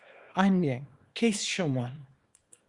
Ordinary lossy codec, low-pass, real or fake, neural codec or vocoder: Opus, 64 kbps; 10.8 kHz; fake; codec, 24 kHz, 0.9 kbps, WavTokenizer, small release